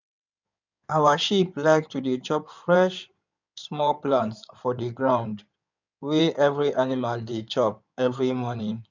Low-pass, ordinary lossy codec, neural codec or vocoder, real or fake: 7.2 kHz; none; codec, 16 kHz in and 24 kHz out, 2.2 kbps, FireRedTTS-2 codec; fake